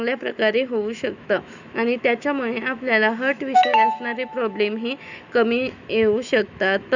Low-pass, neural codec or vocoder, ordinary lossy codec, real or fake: 7.2 kHz; autoencoder, 48 kHz, 128 numbers a frame, DAC-VAE, trained on Japanese speech; none; fake